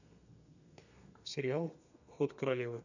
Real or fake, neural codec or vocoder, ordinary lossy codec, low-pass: fake; codec, 32 kHz, 1.9 kbps, SNAC; none; 7.2 kHz